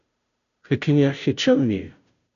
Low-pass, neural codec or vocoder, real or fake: 7.2 kHz; codec, 16 kHz, 0.5 kbps, FunCodec, trained on Chinese and English, 25 frames a second; fake